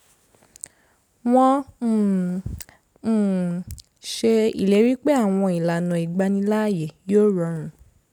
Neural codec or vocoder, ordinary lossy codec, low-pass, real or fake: none; none; 19.8 kHz; real